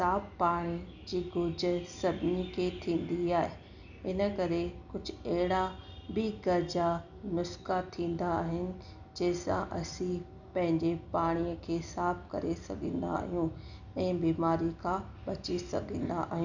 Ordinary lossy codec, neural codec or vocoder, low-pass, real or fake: none; none; 7.2 kHz; real